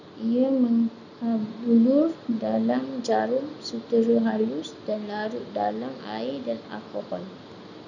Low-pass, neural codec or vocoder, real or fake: 7.2 kHz; none; real